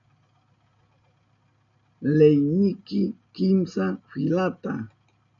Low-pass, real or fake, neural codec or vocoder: 7.2 kHz; real; none